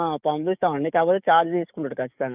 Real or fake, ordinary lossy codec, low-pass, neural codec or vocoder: fake; none; 3.6 kHz; codec, 44.1 kHz, 7.8 kbps, DAC